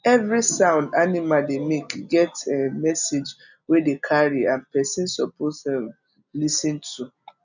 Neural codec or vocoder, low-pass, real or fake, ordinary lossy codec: none; 7.2 kHz; real; none